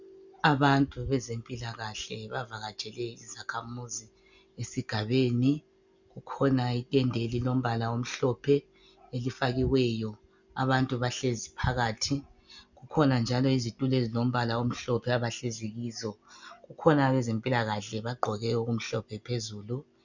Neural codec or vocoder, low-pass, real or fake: none; 7.2 kHz; real